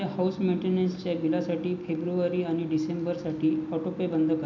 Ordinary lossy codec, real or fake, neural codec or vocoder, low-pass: none; real; none; 7.2 kHz